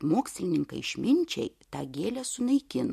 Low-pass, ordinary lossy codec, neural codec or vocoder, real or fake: 14.4 kHz; MP3, 64 kbps; none; real